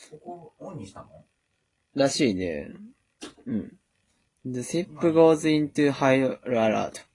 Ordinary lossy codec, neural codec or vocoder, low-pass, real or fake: AAC, 32 kbps; none; 10.8 kHz; real